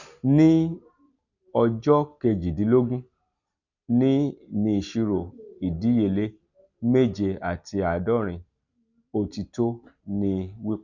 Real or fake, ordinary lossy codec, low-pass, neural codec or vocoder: real; none; 7.2 kHz; none